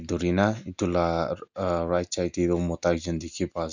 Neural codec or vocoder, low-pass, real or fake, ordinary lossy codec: none; 7.2 kHz; real; none